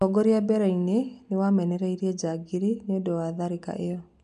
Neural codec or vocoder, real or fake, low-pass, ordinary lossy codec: none; real; 10.8 kHz; none